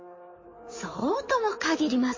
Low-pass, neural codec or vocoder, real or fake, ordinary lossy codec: 7.2 kHz; codec, 16 kHz in and 24 kHz out, 2.2 kbps, FireRedTTS-2 codec; fake; MP3, 32 kbps